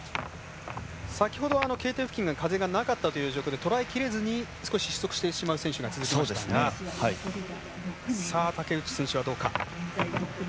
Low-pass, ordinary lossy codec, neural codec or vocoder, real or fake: none; none; none; real